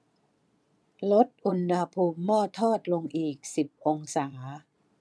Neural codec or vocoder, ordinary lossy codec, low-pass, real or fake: vocoder, 22.05 kHz, 80 mel bands, Vocos; none; none; fake